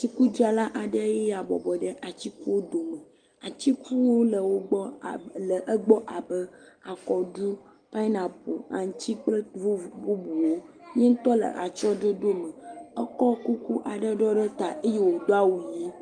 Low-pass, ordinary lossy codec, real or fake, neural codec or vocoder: 9.9 kHz; Opus, 32 kbps; real; none